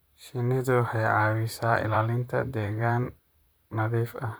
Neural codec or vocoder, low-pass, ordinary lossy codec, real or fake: vocoder, 44.1 kHz, 128 mel bands, Pupu-Vocoder; none; none; fake